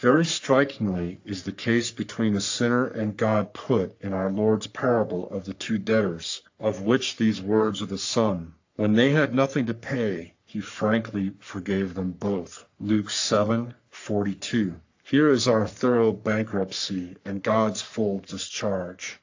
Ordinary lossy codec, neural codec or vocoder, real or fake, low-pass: AAC, 48 kbps; codec, 44.1 kHz, 3.4 kbps, Pupu-Codec; fake; 7.2 kHz